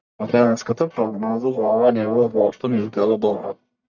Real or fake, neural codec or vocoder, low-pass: fake; codec, 44.1 kHz, 1.7 kbps, Pupu-Codec; 7.2 kHz